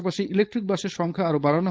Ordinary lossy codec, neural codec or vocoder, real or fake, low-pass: none; codec, 16 kHz, 4.8 kbps, FACodec; fake; none